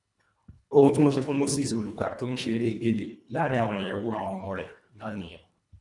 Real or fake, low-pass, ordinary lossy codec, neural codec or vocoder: fake; 10.8 kHz; none; codec, 24 kHz, 1.5 kbps, HILCodec